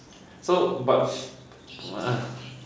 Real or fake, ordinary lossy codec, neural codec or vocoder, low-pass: real; none; none; none